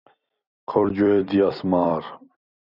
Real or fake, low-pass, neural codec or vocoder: fake; 5.4 kHz; vocoder, 44.1 kHz, 128 mel bands every 256 samples, BigVGAN v2